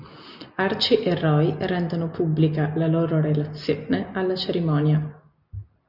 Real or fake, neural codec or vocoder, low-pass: real; none; 5.4 kHz